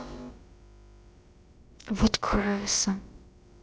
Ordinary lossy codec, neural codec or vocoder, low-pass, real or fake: none; codec, 16 kHz, about 1 kbps, DyCAST, with the encoder's durations; none; fake